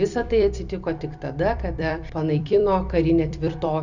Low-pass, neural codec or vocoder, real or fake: 7.2 kHz; vocoder, 44.1 kHz, 128 mel bands every 256 samples, BigVGAN v2; fake